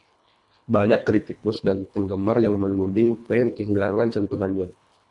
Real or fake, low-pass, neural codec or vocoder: fake; 10.8 kHz; codec, 24 kHz, 1.5 kbps, HILCodec